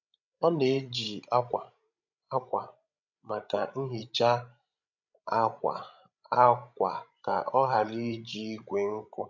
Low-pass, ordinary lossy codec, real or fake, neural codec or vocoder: 7.2 kHz; none; fake; codec, 16 kHz, 16 kbps, FreqCodec, larger model